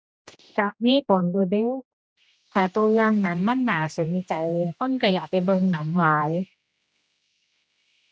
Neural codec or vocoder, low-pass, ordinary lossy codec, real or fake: codec, 16 kHz, 1 kbps, X-Codec, HuBERT features, trained on general audio; none; none; fake